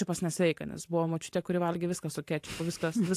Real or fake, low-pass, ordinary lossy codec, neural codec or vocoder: fake; 14.4 kHz; AAC, 64 kbps; vocoder, 44.1 kHz, 128 mel bands every 512 samples, BigVGAN v2